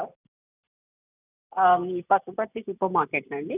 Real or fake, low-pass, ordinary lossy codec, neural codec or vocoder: real; 3.6 kHz; none; none